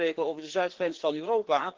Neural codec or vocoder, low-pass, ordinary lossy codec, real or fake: codec, 16 kHz, 2 kbps, FreqCodec, larger model; 7.2 kHz; Opus, 16 kbps; fake